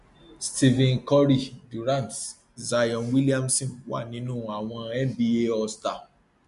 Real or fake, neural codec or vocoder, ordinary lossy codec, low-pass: real; none; MP3, 64 kbps; 10.8 kHz